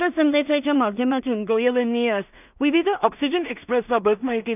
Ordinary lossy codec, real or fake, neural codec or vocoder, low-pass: none; fake; codec, 16 kHz in and 24 kHz out, 0.4 kbps, LongCat-Audio-Codec, two codebook decoder; 3.6 kHz